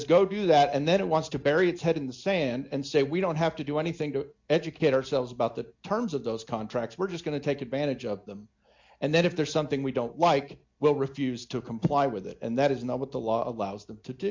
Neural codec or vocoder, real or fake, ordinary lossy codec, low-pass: none; real; MP3, 48 kbps; 7.2 kHz